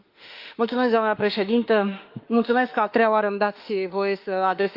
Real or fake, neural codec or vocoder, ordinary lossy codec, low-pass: fake; autoencoder, 48 kHz, 32 numbers a frame, DAC-VAE, trained on Japanese speech; Opus, 24 kbps; 5.4 kHz